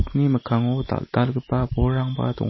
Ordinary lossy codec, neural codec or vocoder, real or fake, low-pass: MP3, 24 kbps; none; real; 7.2 kHz